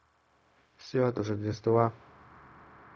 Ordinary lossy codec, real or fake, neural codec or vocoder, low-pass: none; fake; codec, 16 kHz, 0.4 kbps, LongCat-Audio-Codec; none